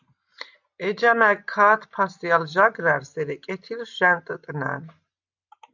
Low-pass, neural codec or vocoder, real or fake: 7.2 kHz; none; real